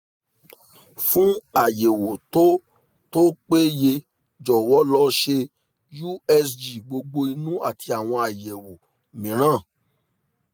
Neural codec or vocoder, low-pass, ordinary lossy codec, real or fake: none; none; none; real